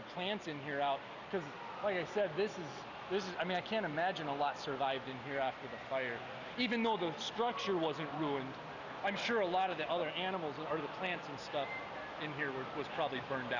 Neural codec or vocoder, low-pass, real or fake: none; 7.2 kHz; real